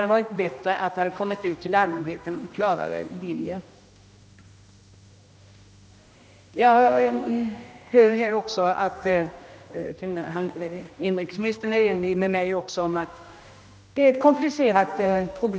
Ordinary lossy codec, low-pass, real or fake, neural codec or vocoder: none; none; fake; codec, 16 kHz, 1 kbps, X-Codec, HuBERT features, trained on general audio